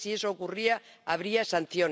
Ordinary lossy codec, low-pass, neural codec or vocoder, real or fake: none; none; none; real